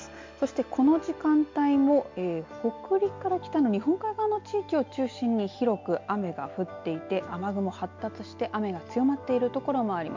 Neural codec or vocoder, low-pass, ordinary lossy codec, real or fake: none; 7.2 kHz; MP3, 48 kbps; real